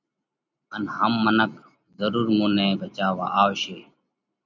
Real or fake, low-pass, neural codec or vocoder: real; 7.2 kHz; none